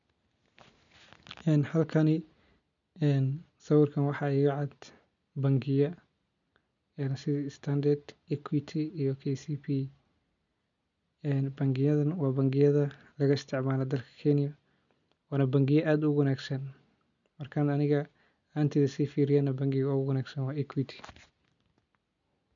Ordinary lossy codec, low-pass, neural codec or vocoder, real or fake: none; 7.2 kHz; none; real